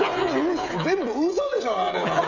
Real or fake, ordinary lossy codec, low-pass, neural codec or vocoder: fake; none; 7.2 kHz; codec, 16 kHz, 16 kbps, FreqCodec, smaller model